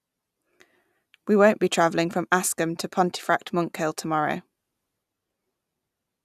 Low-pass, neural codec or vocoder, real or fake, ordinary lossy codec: 14.4 kHz; none; real; none